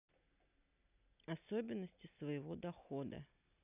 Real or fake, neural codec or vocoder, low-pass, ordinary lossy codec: real; none; 3.6 kHz; none